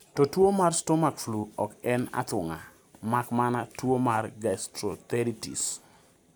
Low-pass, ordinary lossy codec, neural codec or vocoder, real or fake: none; none; none; real